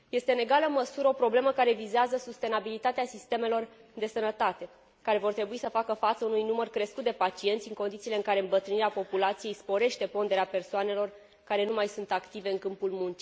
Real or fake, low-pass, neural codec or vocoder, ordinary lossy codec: real; none; none; none